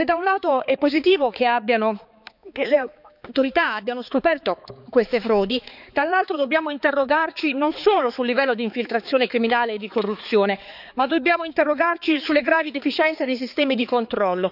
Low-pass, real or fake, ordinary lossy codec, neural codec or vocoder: 5.4 kHz; fake; none; codec, 16 kHz, 4 kbps, X-Codec, HuBERT features, trained on balanced general audio